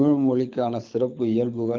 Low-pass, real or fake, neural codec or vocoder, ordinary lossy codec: 7.2 kHz; fake; codec, 24 kHz, 6 kbps, HILCodec; Opus, 24 kbps